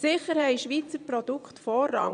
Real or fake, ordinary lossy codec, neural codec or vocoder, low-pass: fake; none; vocoder, 22.05 kHz, 80 mel bands, Vocos; 9.9 kHz